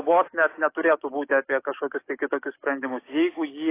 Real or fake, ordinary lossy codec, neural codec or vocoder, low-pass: fake; AAC, 24 kbps; codec, 44.1 kHz, 7.8 kbps, DAC; 3.6 kHz